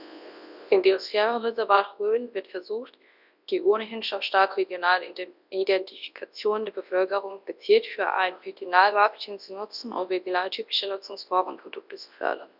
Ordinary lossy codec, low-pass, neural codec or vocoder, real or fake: none; 5.4 kHz; codec, 24 kHz, 0.9 kbps, WavTokenizer, large speech release; fake